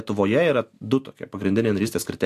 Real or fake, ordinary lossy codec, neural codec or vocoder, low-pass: real; AAC, 64 kbps; none; 14.4 kHz